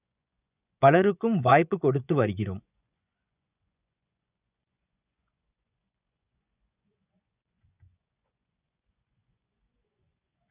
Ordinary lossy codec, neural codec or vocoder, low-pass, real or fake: none; vocoder, 24 kHz, 100 mel bands, Vocos; 3.6 kHz; fake